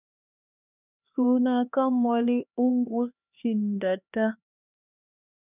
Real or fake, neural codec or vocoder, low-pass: fake; codec, 16 kHz, 2 kbps, X-Codec, HuBERT features, trained on LibriSpeech; 3.6 kHz